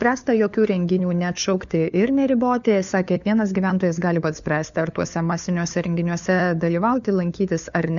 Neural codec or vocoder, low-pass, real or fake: codec, 16 kHz, 4 kbps, FunCodec, trained on LibriTTS, 50 frames a second; 7.2 kHz; fake